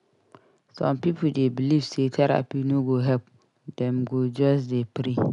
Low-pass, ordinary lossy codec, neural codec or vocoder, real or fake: 10.8 kHz; none; none; real